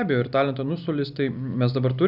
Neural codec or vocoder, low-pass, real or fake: none; 5.4 kHz; real